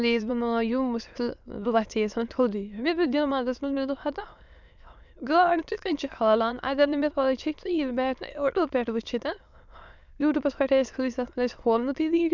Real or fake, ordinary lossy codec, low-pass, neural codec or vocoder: fake; none; 7.2 kHz; autoencoder, 22.05 kHz, a latent of 192 numbers a frame, VITS, trained on many speakers